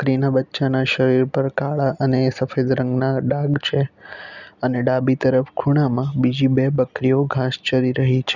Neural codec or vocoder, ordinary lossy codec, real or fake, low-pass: none; none; real; 7.2 kHz